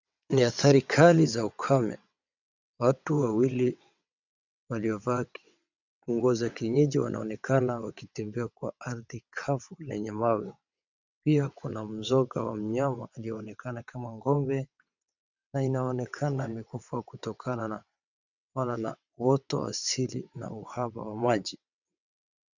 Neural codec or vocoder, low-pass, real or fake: vocoder, 22.05 kHz, 80 mel bands, WaveNeXt; 7.2 kHz; fake